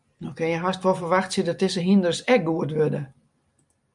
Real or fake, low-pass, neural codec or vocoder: real; 10.8 kHz; none